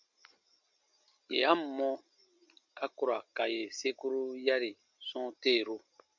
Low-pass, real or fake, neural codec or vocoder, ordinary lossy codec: 7.2 kHz; real; none; MP3, 64 kbps